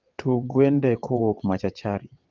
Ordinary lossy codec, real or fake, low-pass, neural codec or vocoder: Opus, 24 kbps; fake; 7.2 kHz; vocoder, 22.05 kHz, 80 mel bands, WaveNeXt